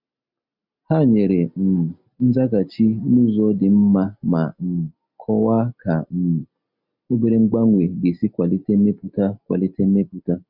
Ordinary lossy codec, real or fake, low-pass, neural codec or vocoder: none; real; 5.4 kHz; none